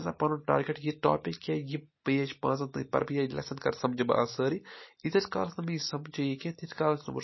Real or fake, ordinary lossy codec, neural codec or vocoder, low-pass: real; MP3, 24 kbps; none; 7.2 kHz